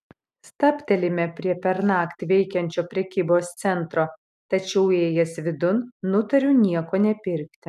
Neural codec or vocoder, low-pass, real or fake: none; 14.4 kHz; real